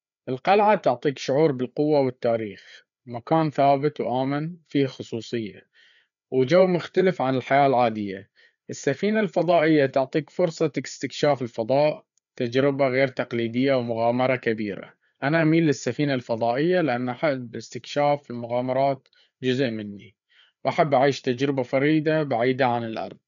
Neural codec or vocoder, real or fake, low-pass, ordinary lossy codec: codec, 16 kHz, 4 kbps, FreqCodec, larger model; fake; 7.2 kHz; MP3, 96 kbps